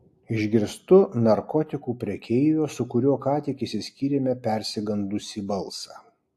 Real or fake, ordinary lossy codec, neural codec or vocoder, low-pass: real; AAC, 64 kbps; none; 14.4 kHz